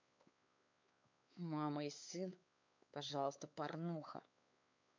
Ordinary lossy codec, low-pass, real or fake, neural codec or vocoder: MP3, 64 kbps; 7.2 kHz; fake; codec, 16 kHz, 4 kbps, X-Codec, WavLM features, trained on Multilingual LibriSpeech